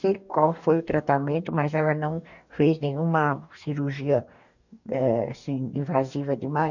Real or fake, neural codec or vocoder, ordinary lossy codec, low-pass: fake; codec, 44.1 kHz, 2.6 kbps, DAC; none; 7.2 kHz